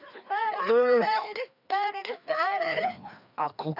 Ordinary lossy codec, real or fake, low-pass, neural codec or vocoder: none; fake; 5.4 kHz; codec, 16 kHz, 2 kbps, FreqCodec, larger model